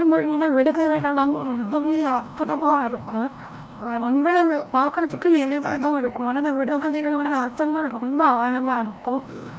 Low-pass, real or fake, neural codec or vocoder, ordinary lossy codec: none; fake; codec, 16 kHz, 0.5 kbps, FreqCodec, larger model; none